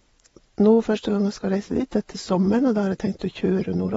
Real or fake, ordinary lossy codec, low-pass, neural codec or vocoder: fake; AAC, 24 kbps; 9.9 kHz; vocoder, 22.05 kHz, 80 mel bands, WaveNeXt